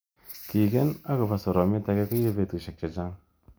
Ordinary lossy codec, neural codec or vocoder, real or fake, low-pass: none; none; real; none